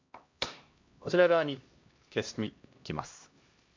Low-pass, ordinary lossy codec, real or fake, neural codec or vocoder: 7.2 kHz; MP3, 48 kbps; fake; codec, 16 kHz, 1 kbps, X-Codec, HuBERT features, trained on LibriSpeech